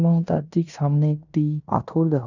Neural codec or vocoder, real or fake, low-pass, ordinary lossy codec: codec, 16 kHz in and 24 kHz out, 0.9 kbps, LongCat-Audio-Codec, fine tuned four codebook decoder; fake; 7.2 kHz; none